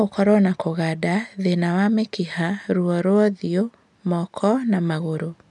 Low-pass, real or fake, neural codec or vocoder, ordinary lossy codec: 10.8 kHz; real; none; none